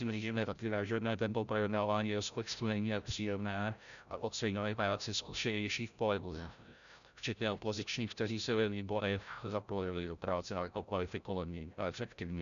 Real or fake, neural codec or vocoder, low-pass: fake; codec, 16 kHz, 0.5 kbps, FreqCodec, larger model; 7.2 kHz